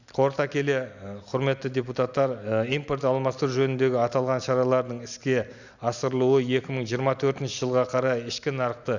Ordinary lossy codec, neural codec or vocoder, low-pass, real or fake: none; none; 7.2 kHz; real